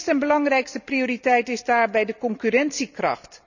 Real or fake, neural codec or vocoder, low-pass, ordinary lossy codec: real; none; 7.2 kHz; none